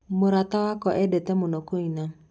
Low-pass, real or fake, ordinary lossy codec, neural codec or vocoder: none; real; none; none